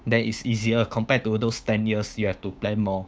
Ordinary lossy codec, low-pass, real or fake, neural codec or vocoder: none; none; fake; codec, 16 kHz, 6 kbps, DAC